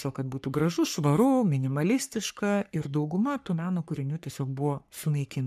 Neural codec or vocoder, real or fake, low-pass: codec, 44.1 kHz, 3.4 kbps, Pupu-Codec; fake; 14.4 kHz